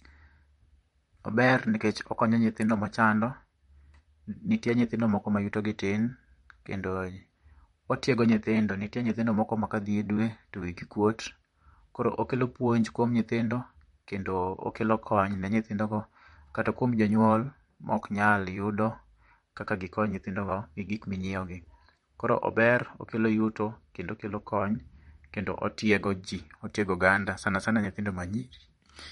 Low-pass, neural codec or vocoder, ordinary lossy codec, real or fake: 19.8 kHz; vocoder, 44.1 kHz, 128 mel bands, Pupu-Vocoder; MP3, 48 kbps; fake